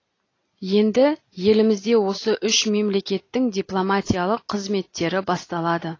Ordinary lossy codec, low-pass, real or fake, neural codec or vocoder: AAC, 32 kbps; 7.2 kHz; real; none